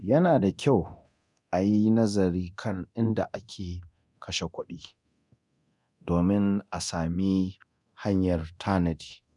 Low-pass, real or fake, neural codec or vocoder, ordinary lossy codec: 10.8 kHz; fake; codec, 24 kHz, 0.9 kbps, DualCodec; none